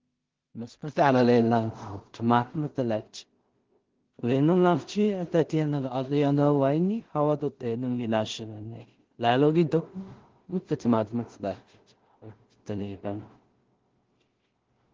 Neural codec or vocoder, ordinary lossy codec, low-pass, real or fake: codec, 16 kHz in and 24 kHz out, 0.4 kbps, LongCat-Audio-Codec, two codebook decoder; Opus, 16 kbps; 7.2 kHz; fake